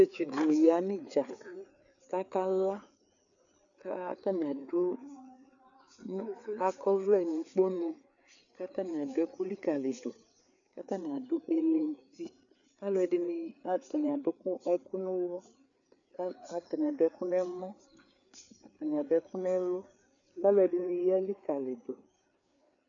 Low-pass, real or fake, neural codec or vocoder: 7.2 kHz; fake; codec, 16 kHz, 4 kbps, FreqCodec, larger model